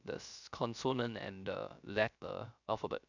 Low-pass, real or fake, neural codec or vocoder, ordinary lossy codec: 7.2 kHz; fake; codec, 16 kHz, 0.3 kbps, FocalCodec; none